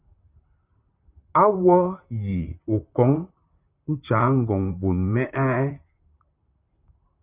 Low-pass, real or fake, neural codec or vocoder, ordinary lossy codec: 3.6 kHz; fake; vocoder, 44.1 kHz, 128 mel bands, Pupu-Vocoder; Opus, 64 kbps